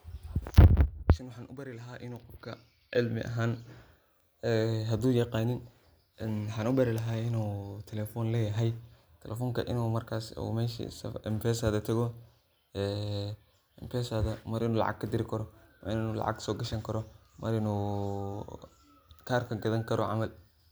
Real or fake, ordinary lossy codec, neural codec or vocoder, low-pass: real; none; none; none